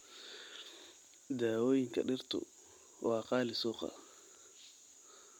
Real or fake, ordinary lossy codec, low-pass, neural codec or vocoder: real; MP3, 96 kbps; 19.8 kHz; none